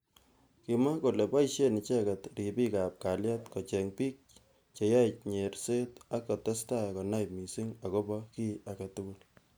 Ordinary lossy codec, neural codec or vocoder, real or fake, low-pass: none; none; real; none